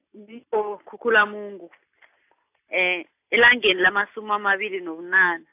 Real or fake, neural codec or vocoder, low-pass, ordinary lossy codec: real; none; 3.6 kHz; AAC, 32 kbps